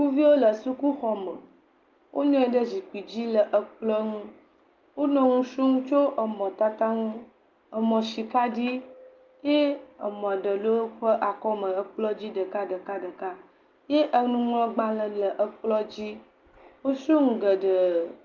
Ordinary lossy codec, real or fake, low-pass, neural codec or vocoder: Opus, 32 kbps; real; 7.2 kHz; none